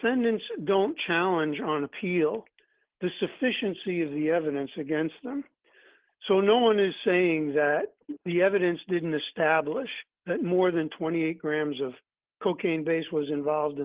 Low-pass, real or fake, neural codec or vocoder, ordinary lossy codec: 3.6 kHz; real; none; Opus, 16 kbps